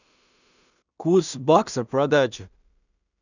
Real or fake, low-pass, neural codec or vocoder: fake; 7.2 kHz; codec, 16 kHz in and 24 kHz out, 0.4 kbps, LongCat-Audio-Codec, two codebook decoder